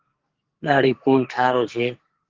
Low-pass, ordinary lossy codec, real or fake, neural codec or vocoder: 7.2 kHz; Opus, 16 kbps; fake; codec, 44.1 kHz, 2.6 kbps, SNAC